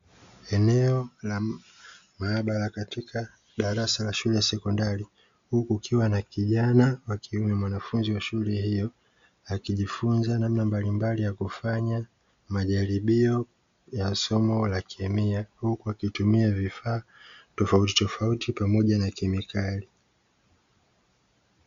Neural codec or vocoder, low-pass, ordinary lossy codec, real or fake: none; 7.2 kHz; MP3, 64 kbps; real